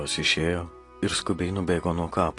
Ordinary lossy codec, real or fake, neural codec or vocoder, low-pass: AAC, 48 kbps; real; none; 10.8 kHz